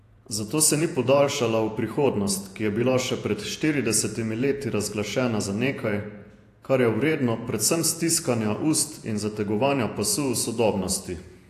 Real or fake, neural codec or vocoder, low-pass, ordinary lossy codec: fake; vocoder, 48 kHz, 128 mel bands, Vocos; 14.4 kHz; AAC, 64 kbps